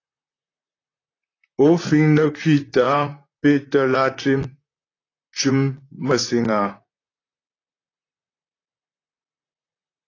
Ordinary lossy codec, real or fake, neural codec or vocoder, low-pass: AAC, 32 kbps; fake; vocoder, 44.1 kHz, 128 mel bands, Pupu-Vocoder; 7.2 kHz